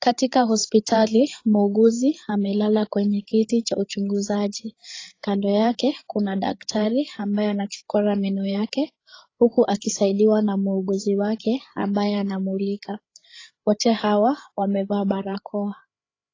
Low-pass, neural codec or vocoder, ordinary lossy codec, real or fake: 7.2 kHz; codec, 16 kHz, 8 kbps, FreqCodec, larger model; AAC, 32 kbps; fake